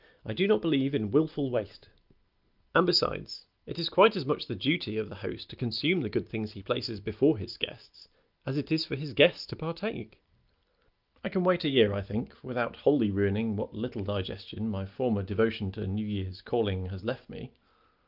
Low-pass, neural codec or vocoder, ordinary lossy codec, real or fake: 5.4 kHz; none; Opus, 24 kbps; real